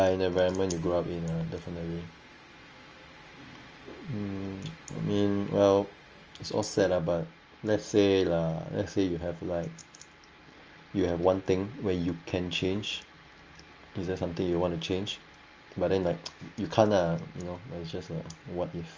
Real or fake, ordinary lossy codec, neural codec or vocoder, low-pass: real; Opus, 24 kbps; none; 7.2 kHz